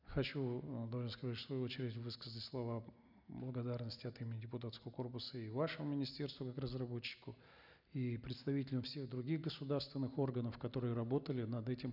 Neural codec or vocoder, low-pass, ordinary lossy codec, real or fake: none; 5.4 kHz; none; real